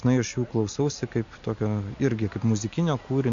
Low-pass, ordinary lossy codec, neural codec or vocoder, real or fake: 7.2 kHz; AAC, 64 kbps; none; real